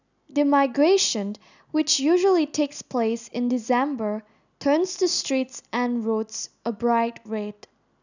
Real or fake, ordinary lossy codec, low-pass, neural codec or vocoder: real; none; 7.2 kHz; none